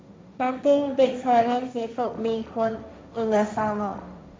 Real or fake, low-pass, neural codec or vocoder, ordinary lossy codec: fake; none; codec, 16 kHz, 1.1 kbps, Voila-Tokenizer; none